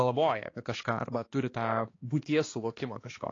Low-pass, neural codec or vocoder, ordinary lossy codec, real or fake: 7.2 kHz; codec, 16 kHz, 2 kbps, X-Codec, HuBERT features, trained on balanced general audio; AAC, 32 kbps; fake